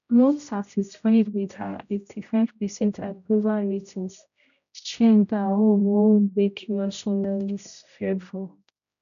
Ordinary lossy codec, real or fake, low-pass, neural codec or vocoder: none; fake; 7.2 kHz; codec, 16 kHz, 0.5 kbps, X-Codec, HuBERT features, trained on general audio